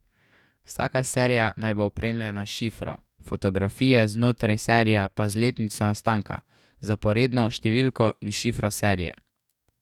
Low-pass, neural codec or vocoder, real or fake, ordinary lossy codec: 19.8 kHz; codec, 44.1 kHz, 2.6 kbps, DAC; fake; none